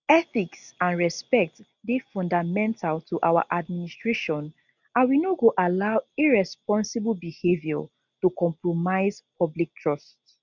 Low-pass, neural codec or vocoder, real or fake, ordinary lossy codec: 7.2 kHz; none; real; none